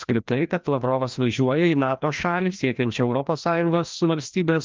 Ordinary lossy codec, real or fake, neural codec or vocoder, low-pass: Opus, 24 kbps; fake; codec, 16 kHz, 1 kbps, FreqCodec, larger model; 7.2 kHz